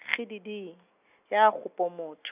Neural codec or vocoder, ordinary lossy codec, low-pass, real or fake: none; none; 3.6 kHz; real